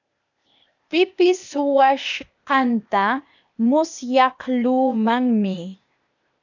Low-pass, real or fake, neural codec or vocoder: 7.2 kHz; fake; codec, 16 kHz, 0.8 kbps, ZipCodec